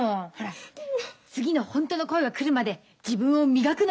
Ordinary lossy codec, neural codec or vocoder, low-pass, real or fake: none; none; none; real